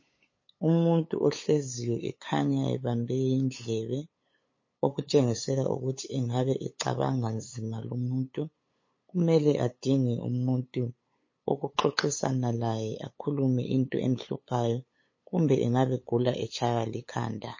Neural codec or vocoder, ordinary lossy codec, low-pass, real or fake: codec, 16 kHz, 8 kbps, FunCodec, trained on LibriTTS, 25 frames a second; MP3, 32 kbps; 7.2 kHz; fake